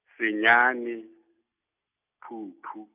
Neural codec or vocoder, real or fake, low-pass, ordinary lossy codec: none; real; 3.6 kHz; none